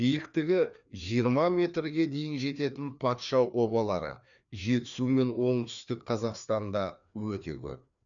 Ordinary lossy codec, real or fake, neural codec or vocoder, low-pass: none; fake; codec, 16 kHz, 2 kbps, FreqCodec, larger model; 7.2 kHz